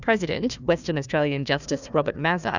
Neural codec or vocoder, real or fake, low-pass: codec, 16 kHz, 1 kbps, FunCodec, trained on Chinese and English, 50 frames a second; fake; 7.2 kHz